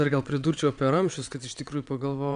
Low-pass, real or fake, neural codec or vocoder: 9.9 kHz; fake; vocoder, 22.05 kHz, 80 mel bands, WaveNeXt